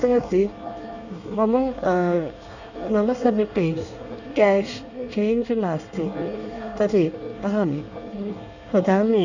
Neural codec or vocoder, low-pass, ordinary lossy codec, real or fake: codec, 24 kHz, 1 kbps, SNAC; 7.2 kHz; none; fake